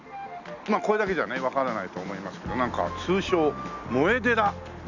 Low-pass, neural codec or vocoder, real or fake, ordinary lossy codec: 7.2 kHz; none; real; none